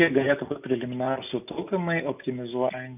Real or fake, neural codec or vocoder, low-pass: real; none; 3.6 kHz